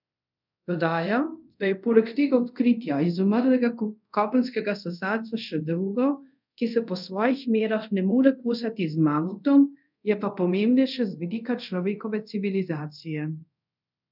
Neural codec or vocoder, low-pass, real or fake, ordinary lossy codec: codec, 24 kHz, 0.5 kbps, DualCodec; 5.4 kHz; fake; none